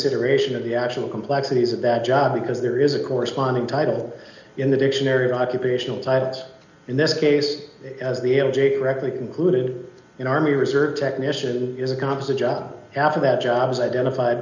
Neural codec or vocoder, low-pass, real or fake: none; 7.2 kHz; real